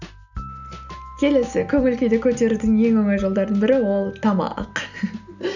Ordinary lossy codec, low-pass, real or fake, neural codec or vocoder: none; 7.2 kHz; real; none